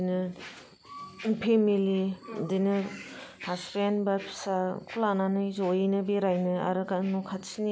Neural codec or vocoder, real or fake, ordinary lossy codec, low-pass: none; real; none; none